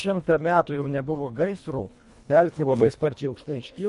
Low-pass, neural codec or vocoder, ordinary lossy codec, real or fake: 10.8 kHz; codec, 24 kHz, 1.5 kbps, HILCodec; MP3, 48 kbps; fake